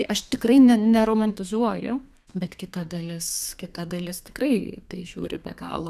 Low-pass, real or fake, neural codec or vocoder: 14.4 kHz; fake; codec, 32 kHz, 1.9 kbps, SNAC